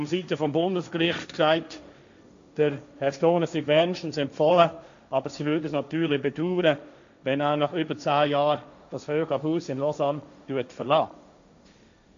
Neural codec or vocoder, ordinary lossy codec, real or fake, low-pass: codec, 16 kHz, 1.1 kbps, Voila-Tokenizer; AAC, 64 kbps; fake; 7.2 kHz